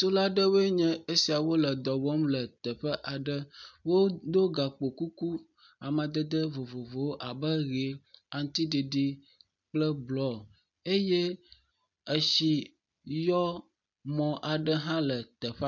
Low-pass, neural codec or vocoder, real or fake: 7.2 kHz; none; real